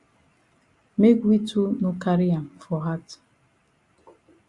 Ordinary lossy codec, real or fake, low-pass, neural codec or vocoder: Opus, 64 kbps; real; 10.8 kHz; none